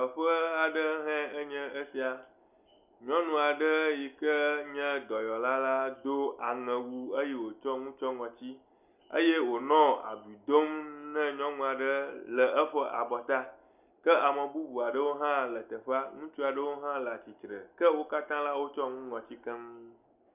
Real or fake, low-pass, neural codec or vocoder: real; 3.6 kHz; none